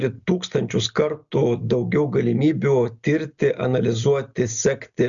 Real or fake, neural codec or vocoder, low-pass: real; none; 7.2 kHz